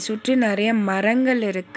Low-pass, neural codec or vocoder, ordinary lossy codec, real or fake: none; none; none; real